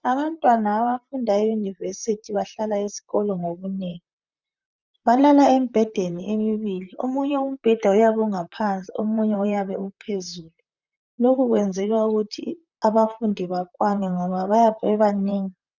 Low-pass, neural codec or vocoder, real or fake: 7.2 kHz; vocoder, 44.1 kHz, 128 mel bands, Pupu-Vocoder; fake